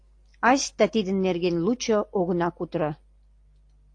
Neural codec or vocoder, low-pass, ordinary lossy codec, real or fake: none; 9.9 kHz; AAC, 48 kbps; real